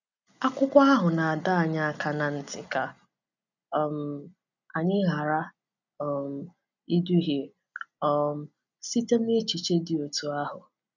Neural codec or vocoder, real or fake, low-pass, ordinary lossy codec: none; real; 7.2 kHz; none